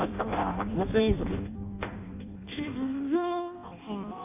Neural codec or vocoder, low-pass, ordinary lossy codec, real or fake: codec, 16 kHz in and 24 kHz out, 0.6 kbps, FireRedTTS-2 codec; 3.6 kHz; AAC, 32 kbps; fake